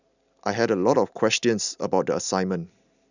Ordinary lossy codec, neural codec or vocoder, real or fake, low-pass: none; none; real; 7.2 kHz